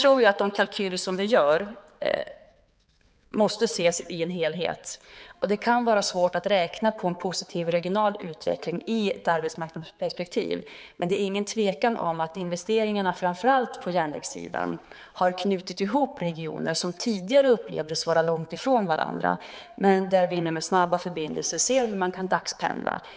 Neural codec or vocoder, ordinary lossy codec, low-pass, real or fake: codec, 16 kHz, 4 kbps, X-Codec, HuBERT features, trained on balanced general audio; none; none; fake